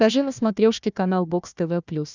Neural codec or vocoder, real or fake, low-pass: codec, 16 kHz, 1 kbps, FunCodec, trained on Chinese and English, 50 frames a second; fake; 7.2 kHz